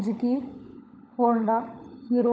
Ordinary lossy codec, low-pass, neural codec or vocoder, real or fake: none; none; codec, 16 kHz, 16 kbps, FunCodec, trained on LibriTTS, 50 frames a second; fake